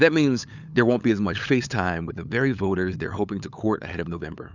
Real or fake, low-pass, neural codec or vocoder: fake; 7.2 kHz; codec, 16 kHz, 8 kbps, FunCodec, trained on LibriTTS, 25 frames a second